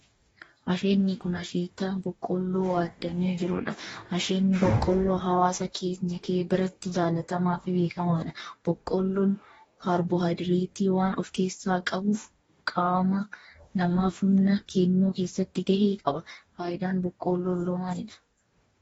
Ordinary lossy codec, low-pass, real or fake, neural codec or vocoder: AAC, 24 kbps; 19.8 kHz; fake; codec, 44.1 kHz, 2.6 kbps, DAC